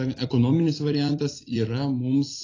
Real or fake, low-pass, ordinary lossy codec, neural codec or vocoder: fake; 7.2 kHz; AAC, 32 kbps; vocoder, 44.1 kHz, 128 mel bands every 256 samples, BigVGAN v2